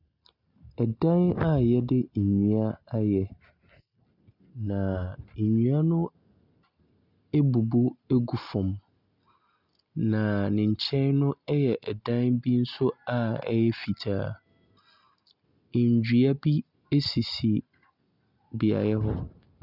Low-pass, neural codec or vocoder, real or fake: 5.4 kHz; none; real